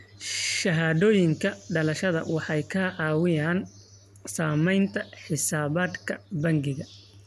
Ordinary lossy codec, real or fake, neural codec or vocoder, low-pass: none; real; none; 14.4 kHz